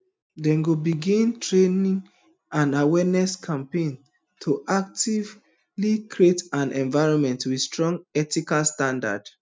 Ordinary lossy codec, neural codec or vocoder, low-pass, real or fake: none; none; none; real